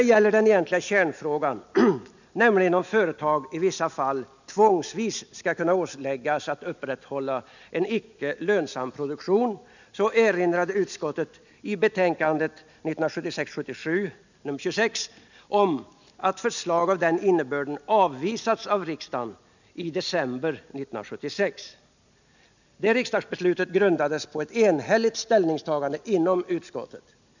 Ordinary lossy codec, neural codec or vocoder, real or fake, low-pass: none; none; real; 7.2 kHz